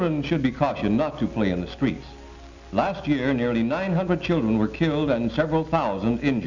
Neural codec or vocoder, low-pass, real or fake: none; 7.2 kHz; real